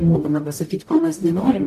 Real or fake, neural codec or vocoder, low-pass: fake; codec, 44.1 kHz, 0.9 kbps, DAC; 14.4 kHz